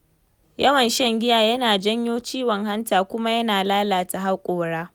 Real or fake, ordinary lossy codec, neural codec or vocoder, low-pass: real; none; none; none